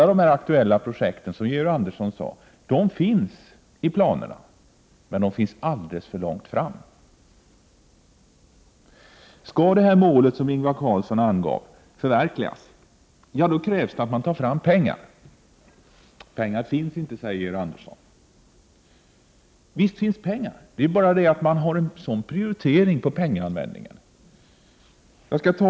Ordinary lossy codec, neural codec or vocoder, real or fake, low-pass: none; none; real; none